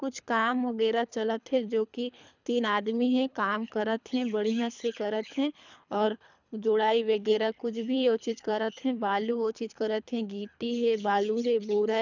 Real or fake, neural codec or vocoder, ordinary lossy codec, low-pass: fake; codec, 24 kHz, 3 kbps, HILCodec; none; 7.2 kHz